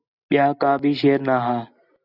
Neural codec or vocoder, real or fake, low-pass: none; real; 5.4 kHz